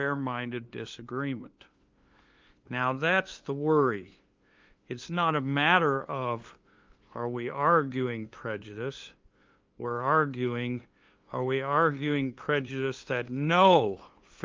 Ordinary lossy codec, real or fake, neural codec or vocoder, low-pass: Opus, 32 kbps; fake; codec, 16 kHz, 2 kbps, FunCodec, trained on LibriTTS, 25 frames a second; 7.2 kHz